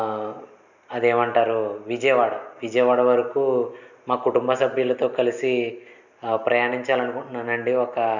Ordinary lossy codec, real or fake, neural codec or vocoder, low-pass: none; real; none; 7.2 kHz